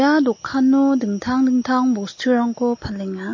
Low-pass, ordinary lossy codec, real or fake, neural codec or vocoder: 7.2 kHz; MP3, 32 kbps; real; none